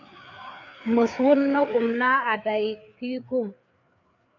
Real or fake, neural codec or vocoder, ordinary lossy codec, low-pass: fake; codec, 16 kHz, 4 kbps, FreqCodec, larger model; AAC, 48 kbps; 7.2 kHz